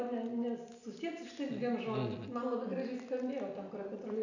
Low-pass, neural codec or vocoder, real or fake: 7.2 kHz; none; real